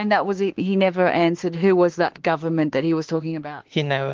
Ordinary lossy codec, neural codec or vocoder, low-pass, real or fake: Opus, 16 kbps; autoencoder, 48 kHz, 32 numbers a frame, DAC-VAE, trained on Japanese speech; 7.2 kHz; fake